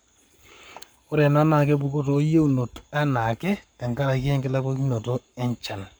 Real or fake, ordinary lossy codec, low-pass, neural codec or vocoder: fake; none; none; codec, 44.1 kHz, 7.8 kbps, Pupu-Codec